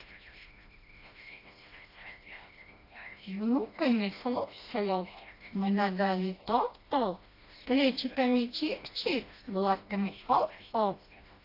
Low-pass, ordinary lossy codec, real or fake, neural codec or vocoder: 5.4 kHz; AAC, 32 kbps; fake; codec, 16 kHz, 1 kbps, FreqCodec, smaller model